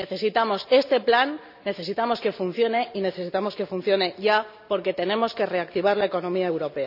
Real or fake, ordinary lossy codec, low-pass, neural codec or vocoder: real; none; 5.4 kHz; none